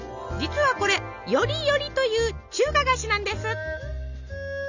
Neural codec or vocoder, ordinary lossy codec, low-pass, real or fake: none; none; 7.2 kHz; real